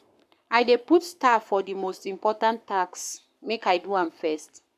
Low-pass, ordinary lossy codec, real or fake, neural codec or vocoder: 14.4 kHz; none; fake; codec, 44.1 kHz, 7.8 kbps, Pupu-Codec